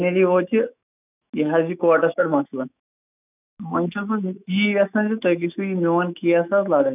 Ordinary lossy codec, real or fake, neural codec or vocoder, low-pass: none; fake; autoencoder, 48 kHz, 128 numbers a frame, DAC-VAE, trained on Japanese speech; 3.6 kHz